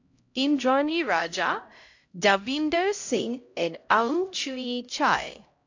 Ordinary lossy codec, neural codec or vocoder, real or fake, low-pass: AAC, 48 kbps; codec, 16 kHz, 0.5 kbps, X-Codec, HuBERT features, trained on LibriSpeech; fake; 7.2 kHz